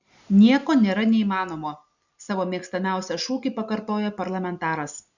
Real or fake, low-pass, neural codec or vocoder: real; 7.2 kHz; none